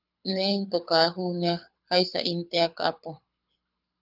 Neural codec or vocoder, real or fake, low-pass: codec, 24 kHz, 6 kbps, HILCodec; fake; 5.4 kHz